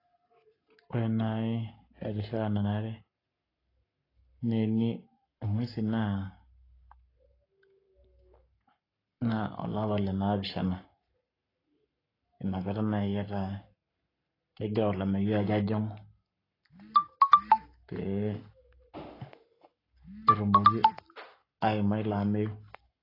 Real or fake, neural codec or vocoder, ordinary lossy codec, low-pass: fake; codec, 44.1 kHz, 7.8 kbps, Pupu-Codec; AAC, 24 kbps; 5.4 kHz